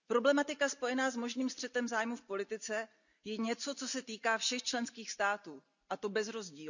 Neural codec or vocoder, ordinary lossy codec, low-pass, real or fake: none; none; 7.2 kHz; real